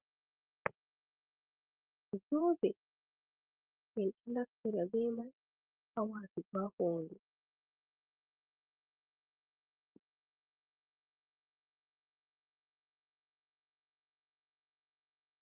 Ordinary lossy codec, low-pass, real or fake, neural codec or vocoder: Opus, 32 kbps; 3.6 kHz; fake; vocoder, 44.1 kHz, 128 mel bands every 512 samples, BigVGAN v2